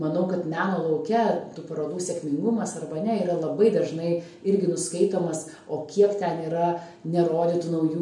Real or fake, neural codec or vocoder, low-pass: real; none; 10.8 kHz